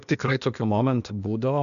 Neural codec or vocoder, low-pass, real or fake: codec, 16 kHz, 0.8 kbps, ZipCodec; 7.2 kHz; fake